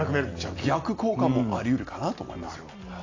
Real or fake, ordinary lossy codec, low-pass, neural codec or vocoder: real; AAC, 32 kbps; 7.2 kHz; none